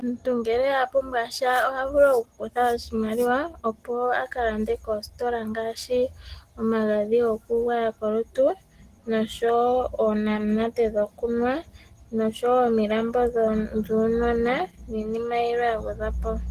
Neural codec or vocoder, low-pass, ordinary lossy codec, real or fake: none; 14.4 kHz; Opus, 16 kbps; real